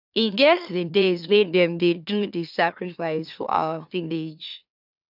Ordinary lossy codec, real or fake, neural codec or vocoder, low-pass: none; fake; autoencoder, 44.1 kHz, a latent of 192 numbers a frame, MeloTTS; 5.4 kHz